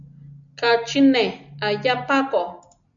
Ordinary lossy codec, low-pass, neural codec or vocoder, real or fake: MP3, 96 kbps; 7.2 kHz; none; real